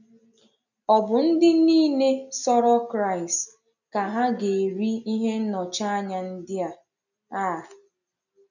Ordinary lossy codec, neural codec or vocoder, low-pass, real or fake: none; none; 7.2 kHz; real